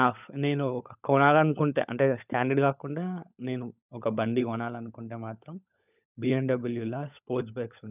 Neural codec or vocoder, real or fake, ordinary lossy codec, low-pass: codec, 16 kHz, 16 kbps, FunCodec, trained on LibriTTS, 50 frames a second; fake; none; 3.6 kHz